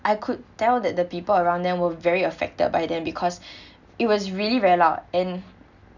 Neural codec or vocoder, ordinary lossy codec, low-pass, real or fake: none; none; 7.2 kHz; real